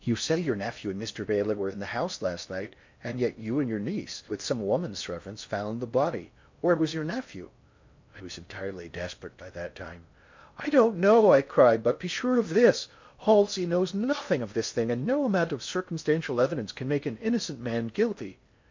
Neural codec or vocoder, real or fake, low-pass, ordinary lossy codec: codec, 16 kHz in and 24 kHz out, 0.6 kbps, FocalCodec, streaming, 4096 codes; fake; 7.2 kHz; MP3, 48 kbps